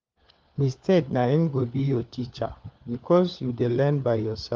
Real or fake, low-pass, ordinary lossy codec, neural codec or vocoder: fake; 7.2 kHz; Opus, 32 kbps; codec, 16 kHz, 4 kbps, FunCodec, trained on LibriTTS, 50 frames a second